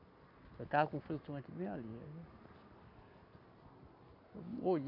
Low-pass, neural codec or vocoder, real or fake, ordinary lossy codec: 5.4 kHz; none; real; AAC, 32 kbps